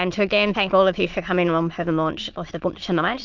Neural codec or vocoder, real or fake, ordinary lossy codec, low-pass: autoencoder, 22.05 kHz, a latent of 192 numbers a frame, VITS, trained on many speakers; fake; Opus, 32 kbps; 7.2 kHz